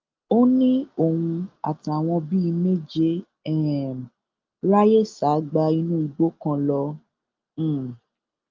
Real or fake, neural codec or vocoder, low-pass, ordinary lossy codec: real; none; 7.2 kHz; Opus, 32 kbps